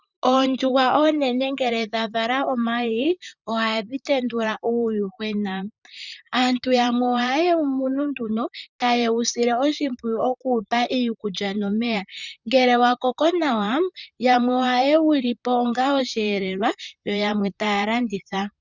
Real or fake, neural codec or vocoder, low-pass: fake; vocoder, 44.1 kHz, 128 mel bands, Pupu-Vocoder; 7.2 kHz